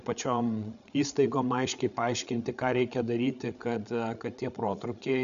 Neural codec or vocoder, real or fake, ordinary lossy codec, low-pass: codec, 16 kHz, 8 kbps, FreqCodec, larger model; fake; AAC, 96 kbps; 7.2 kHz